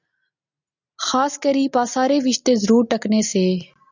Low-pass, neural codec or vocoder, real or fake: 7.2 kHz; none; real